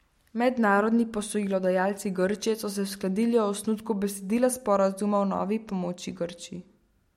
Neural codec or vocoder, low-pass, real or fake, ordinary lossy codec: none; 19.8 kHz; real; MP3, 64 kbps